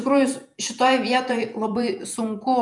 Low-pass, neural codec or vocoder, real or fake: 10.8 kHz; vocoder, 48 kHz, 128 mel bands, Vocos; fake